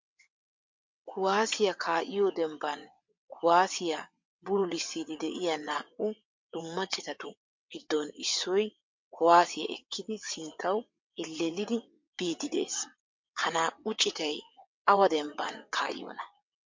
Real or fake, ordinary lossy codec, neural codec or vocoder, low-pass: fake; MP3, 48 kbps; vocoder, 22.05 kHz, 80 mel bands, WaveNeXt; 7.2 kHz